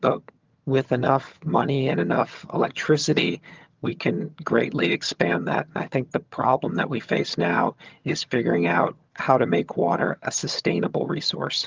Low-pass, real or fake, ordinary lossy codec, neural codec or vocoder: 7.2 kHz; fake; Opus, 24 kbps; vocoder, 22.05 kHz, 80 mel bands, HiFi-GAN